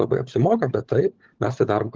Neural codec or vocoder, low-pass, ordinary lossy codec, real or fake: codec, 16 kHz, 16 kbps, FunCodec, trained on LibriTTS, 50 frames a second; 7.2 kHz; Opus, 16 kbps; fake